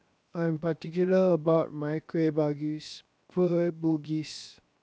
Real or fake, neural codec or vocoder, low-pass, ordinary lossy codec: fake; codec, 16 kHz, 0.7 kbps, FocalCodec; none; none